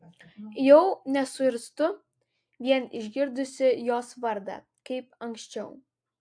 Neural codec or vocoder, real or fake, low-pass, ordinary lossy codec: none; real; 9.9 kHz; AAC, 64 kbps